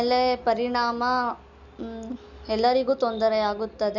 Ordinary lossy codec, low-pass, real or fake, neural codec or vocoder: none; 7.2 kHz; real; none